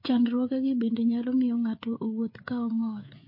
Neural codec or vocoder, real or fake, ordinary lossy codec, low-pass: codec, 16 kHz, 16 kbps, FreqCodec, smaller model; fake; MP3, 32 kbps; 5.4 kHz